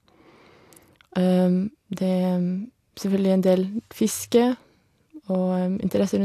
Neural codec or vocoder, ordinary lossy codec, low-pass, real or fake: none; AAC, 64 kbps; 14.4 kHz; real